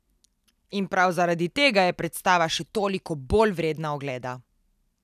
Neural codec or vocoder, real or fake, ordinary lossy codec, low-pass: none; real; none; 14.4 kHz